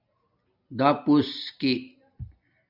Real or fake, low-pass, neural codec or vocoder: real; 5.4 kHz; none